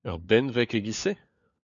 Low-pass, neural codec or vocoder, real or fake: 7.2 kHz; codec, 16 kHz, 4 kbps, FunCodec, trained on LibriTTS, 50 frames a second; fake